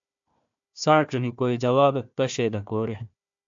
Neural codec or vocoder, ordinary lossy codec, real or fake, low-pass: codec, 16 kHz, 1 kbps, FunCodec, trained on Chinese and English, 50 frames a second; AAC, 64 kbps; fake; 7.2 kHz